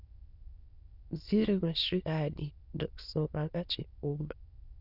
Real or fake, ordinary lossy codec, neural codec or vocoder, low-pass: fake; Opus, 64 kbps; autoencoder, 22.05 kHz, a latent of 192 numbers a frame, VITS, trained on many speakers; 5.4 kHz